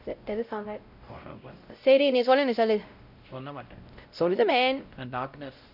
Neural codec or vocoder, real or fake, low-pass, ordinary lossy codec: codec, 16 kHz, 0.5 kbps, X-Codec, WavLM features, trained on Multilingual LibriSpeech; fake; 5.4 kHz; none